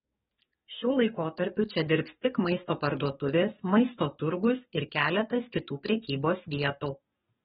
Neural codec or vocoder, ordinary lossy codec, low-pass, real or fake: codec, 44.1 kHz, 7.8 kbps, DAC; AAC, 16 kbps; 19.8 kHz; fake